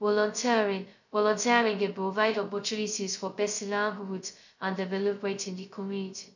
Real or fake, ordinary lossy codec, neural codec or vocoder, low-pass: fake; none; codec, 16 kHz, 0.2 kbps, FocalCodec; 7.2 kHz